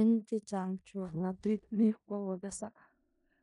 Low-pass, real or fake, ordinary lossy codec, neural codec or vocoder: 10.8 kHz; fake; none; codec, 16 kHz in and 24 kHz out, 0.4 kbps, LongCat-Audio-Codec, four codebook decoder